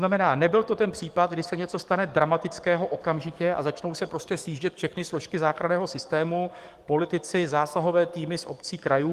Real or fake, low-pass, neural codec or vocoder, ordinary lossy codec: fake; 14.4 kHz; codec, 44.1 kHz, 7.8 kbps, DAC; Opus, 24 kbps